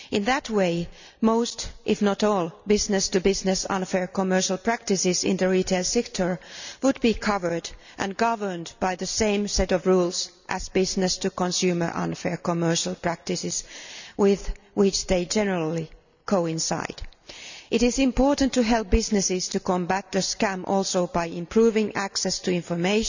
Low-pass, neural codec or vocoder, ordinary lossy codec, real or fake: 7.2 kHz; none; none; real